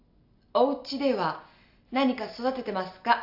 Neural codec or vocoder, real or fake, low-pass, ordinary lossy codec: none; real; 5.4 kHz; AAC, 48 kbps